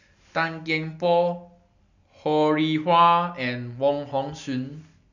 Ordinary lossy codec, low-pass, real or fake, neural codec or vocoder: none; 7.2 kHz; real; none